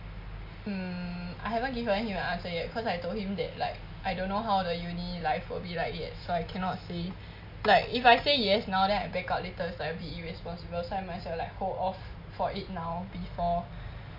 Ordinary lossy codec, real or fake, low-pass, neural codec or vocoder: MP3, 48 kbps; real; 5.4 kHz; none